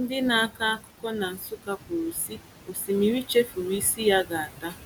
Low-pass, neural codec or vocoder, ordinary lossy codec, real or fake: 19.8 kHz; none; none; real